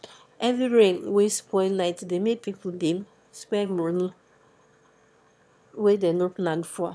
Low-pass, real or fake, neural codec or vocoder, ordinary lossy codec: none; fake; autoencoder, 22.05 kHz, a latent of 192 numbers a frame, VITS, trained on one speaker; none